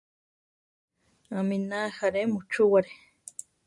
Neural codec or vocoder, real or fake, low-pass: none; real; 10.8 kHz